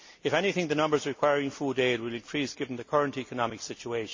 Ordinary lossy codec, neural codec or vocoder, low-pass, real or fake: MP3, 32 kbps; none; 7.2 kHz; real